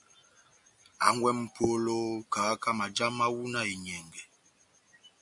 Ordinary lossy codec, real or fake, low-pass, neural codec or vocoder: MP3, 64 kbps; real; 10.8 kHz; none